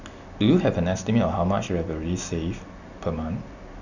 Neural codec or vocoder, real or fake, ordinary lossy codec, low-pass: none; real; none; 7.2 kHz